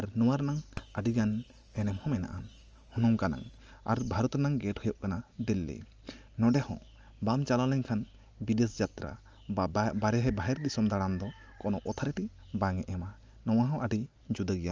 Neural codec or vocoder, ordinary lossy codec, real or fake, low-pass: none; Opus, 24 kbps; real; 7.2 kHz